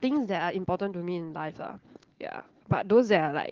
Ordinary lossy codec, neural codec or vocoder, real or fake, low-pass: Opus, 16 kbps; none; real; 7.2 kHz